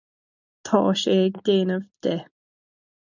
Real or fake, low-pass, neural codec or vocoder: real; 7.2 kHz; none